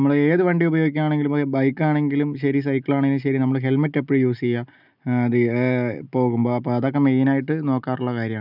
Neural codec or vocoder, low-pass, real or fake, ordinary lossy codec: none; 5.4 kHz; real; none